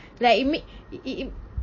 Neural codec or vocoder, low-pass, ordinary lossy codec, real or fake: none; 7.2 kHz; MP3, 32 kbps; real